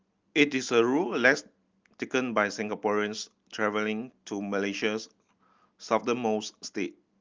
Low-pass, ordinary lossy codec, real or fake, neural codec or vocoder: 7.2 kHz; Opus, 24 kbps; real; none